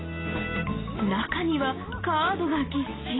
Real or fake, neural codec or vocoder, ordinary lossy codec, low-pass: real; none; AAC, 16 kbps; 7.2 kHz